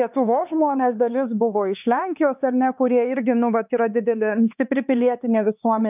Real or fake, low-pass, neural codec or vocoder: fake; 3.6 kHz; codec, 16 kHz, 4 kbps, X-Codec, HuBERT features, trained on LibriSpeech